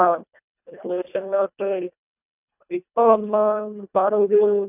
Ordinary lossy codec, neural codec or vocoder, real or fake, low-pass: none; codec, 24 kHz, 1.5 kbps, HILCodec; fake; 3.6 kHz